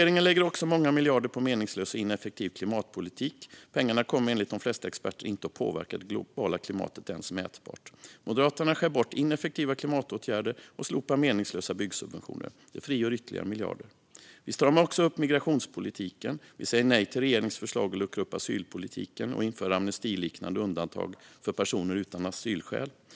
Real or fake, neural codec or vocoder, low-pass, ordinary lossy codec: real; none; none; none